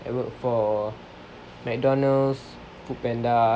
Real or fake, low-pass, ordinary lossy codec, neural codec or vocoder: real; none; none; none